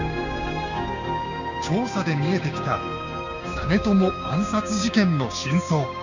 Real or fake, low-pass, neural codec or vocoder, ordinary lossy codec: fake; 7.2 kHz; codec, 16 kHz, 6 kbps, DAC; none